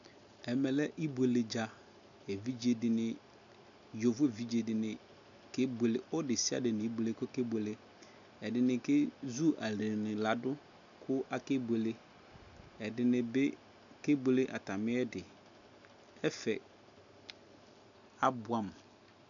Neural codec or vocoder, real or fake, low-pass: none; real; 7.2 kHz